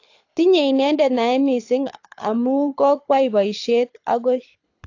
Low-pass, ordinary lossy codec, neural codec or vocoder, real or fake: 7.2 kHz; AAC, 48 kbps; codec, 24 kHz, 6 kbps, HILCodec; fake